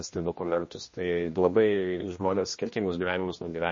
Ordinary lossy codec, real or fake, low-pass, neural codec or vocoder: MP3, 32 kbps; fake; 7.2 kHz; codec, 16 kHz, 1 kbps, X-Codec, HuBERT features, trained on general audio